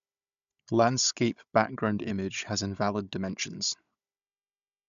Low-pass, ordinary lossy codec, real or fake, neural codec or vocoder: 7.2 kHz; AAC, 96 kbps; fake; codec, 16 kHz, 16 kbps, FunCodec, trained on Chinese and English, 50 frames a second